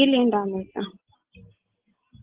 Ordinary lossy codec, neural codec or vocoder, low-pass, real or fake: Opus, 16 kbps; none; 3.6 kHz; real